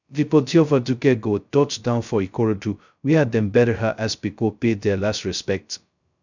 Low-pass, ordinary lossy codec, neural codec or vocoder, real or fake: 7.2 kHz; none; codec, 16 kHz, 0.2 kbps, FocalCodec; fake